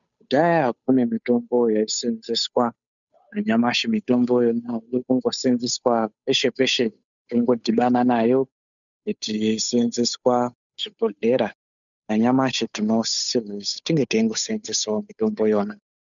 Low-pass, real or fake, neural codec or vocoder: 7.2 kHz; fake; codec, 16 kHz, 8 kbps, FunCodec, trained on Chinese and English, 25 frames a second